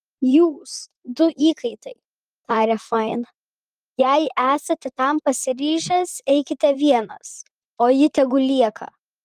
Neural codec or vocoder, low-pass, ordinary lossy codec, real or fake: vocoder, 44.1 kHz, 128 mel bands, Pupu-Vocoder; 14.4 kHz; Opus, 32 kbps; fake